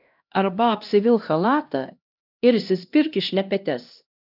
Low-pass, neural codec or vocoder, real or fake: 5.4 kHz; codec, 16 kHz, 1 kbps, X-Codec, HuBERT features, trained on LibriSpeech; fake